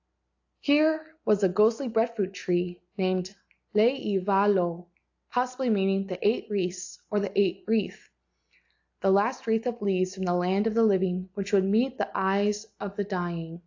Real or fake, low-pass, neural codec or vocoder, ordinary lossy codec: real; 7.2 kHz; none; AAC, 48 kbps